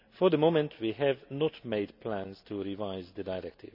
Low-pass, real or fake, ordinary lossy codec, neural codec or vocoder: 5.4 kHz; real; none; none